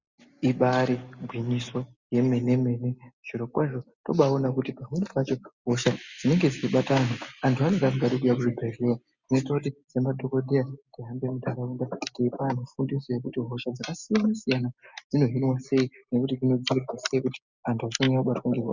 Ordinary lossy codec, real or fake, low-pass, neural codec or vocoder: Opus, 64 kbps; real; 7.2 kHz; none